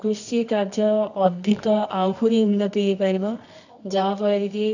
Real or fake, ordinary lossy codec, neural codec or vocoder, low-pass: fake; none; codec, 24 kHz, 0.9 kbps, WavTokenizer, medium music audio release; 7.2 kHz